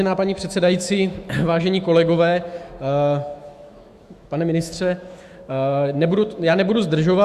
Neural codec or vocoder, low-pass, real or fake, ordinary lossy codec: autoencoder, 48 kHz, 128 numbers a frame, DAC-VAE, trained on Japanese speech; 14.4 kHz; fake; Opus, 64 kbps